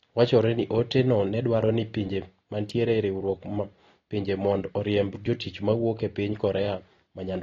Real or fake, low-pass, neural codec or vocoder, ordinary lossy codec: real; 7.2 kHz; none; AAC, 32 kbps